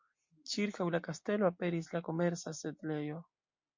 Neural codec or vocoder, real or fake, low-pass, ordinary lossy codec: none; real; 7.2 kHz; MP3, 48 kbps